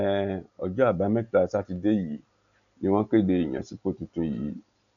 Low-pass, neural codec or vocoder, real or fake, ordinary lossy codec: 7.2 kHz; none; real; none